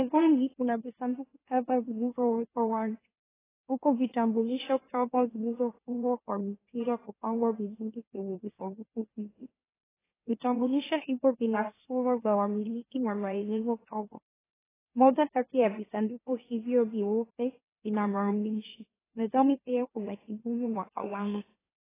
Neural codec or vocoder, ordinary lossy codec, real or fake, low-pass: autoencoder, 44.1 kHz, a latent of 192 numbers a frame, MeloTTS; AAC, 16 kbps; fake; 3.6 kHz